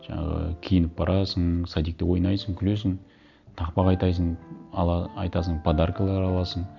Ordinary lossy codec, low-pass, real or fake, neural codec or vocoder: none; 7.2 kHz; real; none